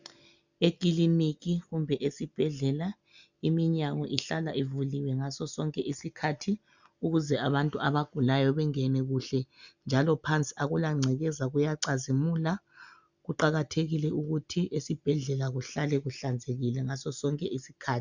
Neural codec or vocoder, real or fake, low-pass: none; real; 7.2 kHz